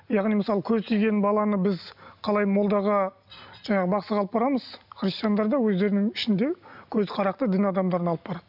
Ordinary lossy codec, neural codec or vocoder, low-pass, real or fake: none; none; 5.4 kHz; real